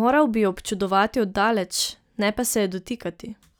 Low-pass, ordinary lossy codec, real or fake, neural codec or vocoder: none; none; real; none